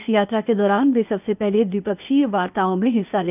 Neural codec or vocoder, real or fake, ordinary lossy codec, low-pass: codec, 16 kHz, 0.8 kbps, ZipCodec; fake; none; 3.6 kHz